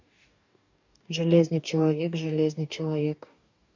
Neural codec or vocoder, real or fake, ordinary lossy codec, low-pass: codec, 44.1 kHz, 2.6 kbps, DAC; fake; MP3, 64 kbps; 7.2 kHz